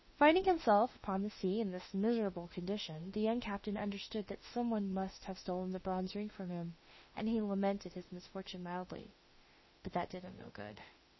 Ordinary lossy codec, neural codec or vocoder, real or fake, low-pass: MP3, 24 kbps; autoencoder, 48 kHz, 32 numbers a frame, DAC-VAE, trained on Japanese speech; fake; 7.2 kHz